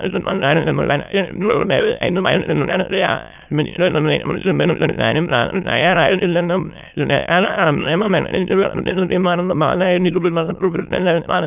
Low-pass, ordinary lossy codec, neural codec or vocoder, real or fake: 3.6 kHz; none; autoencoder, 22.05 kHz, a latent of 192 numbers a frame, VITS, trained on many speakers; fake